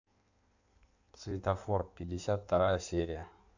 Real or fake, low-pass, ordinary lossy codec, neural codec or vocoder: fake; 7.2 kHz; none; codec, 16 kHz in and 24 kHz out, 1.1 kbps, FireRedTTS-2 codec